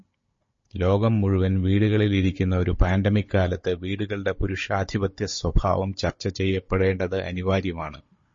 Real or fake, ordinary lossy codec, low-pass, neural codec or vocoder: fake; MP3, 32 kbps; 7.2 kHz; codec, 16 kHz, 16 kbps, FunCodec, trained on Chinese and English, 50 frames a second